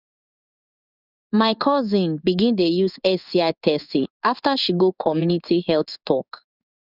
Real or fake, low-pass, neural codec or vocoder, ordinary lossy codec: fake; 5.4 kHz; codec, 16 kHz in and 24 kHz out, 1 kbps, XY-Tokenizer; none